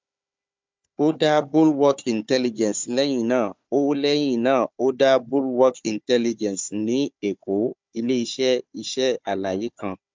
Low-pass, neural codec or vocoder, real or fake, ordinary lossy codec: 7.2 kHz; codec, 16 kHz, 4 kbps, FunCodec, trained on Chinese and English, 50 frames a second; fake; MP3, 64 kbps